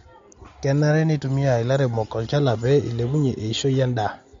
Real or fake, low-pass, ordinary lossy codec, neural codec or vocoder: real; 7.2 kHz; MP3, 48 kbps; none